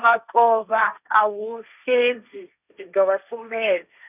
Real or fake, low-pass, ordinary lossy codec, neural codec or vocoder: fake; 3.6 kHz; none; codec, 16 kHz, 1.1 kbps, Voila-Tokenizer